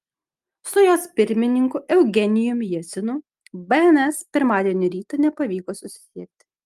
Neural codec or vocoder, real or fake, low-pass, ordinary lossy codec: none; real; 14.4 kHz; Opus, 32 kbps